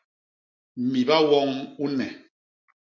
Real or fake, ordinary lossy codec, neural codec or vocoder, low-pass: real; MP3, 48 kbps; none; 7.2 kHz